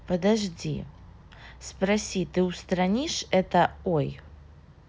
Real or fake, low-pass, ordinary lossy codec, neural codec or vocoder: real; none; none; none